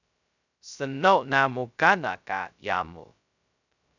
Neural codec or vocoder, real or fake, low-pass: codec, 16 kHz, 0.2 kbps, FocalCodec; fake; 7.2 kHz